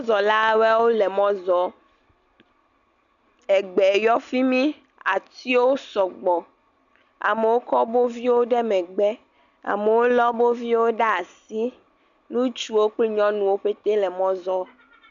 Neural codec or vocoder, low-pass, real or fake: none; 7.2 kHz; real